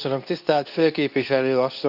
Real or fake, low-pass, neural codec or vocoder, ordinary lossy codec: fake; 5.4 kHz; codec, 24 kHz, 0.9 kbps, WavTokenizer, medium speech release version 2; none